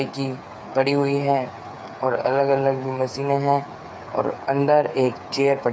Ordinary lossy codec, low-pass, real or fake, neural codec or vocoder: none; none; fake; codec, 16 kHz, 8 kbps, FreqCodec, smaller model